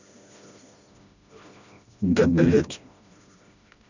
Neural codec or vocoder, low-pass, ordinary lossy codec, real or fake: codec, 16 kHz, 1 kbps, FreqCodec, smaller model; 7.2 kHz; none; fake